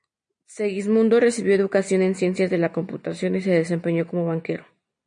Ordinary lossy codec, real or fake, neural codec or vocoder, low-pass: MP3, 48 kbps; real; none; 9.9 kHz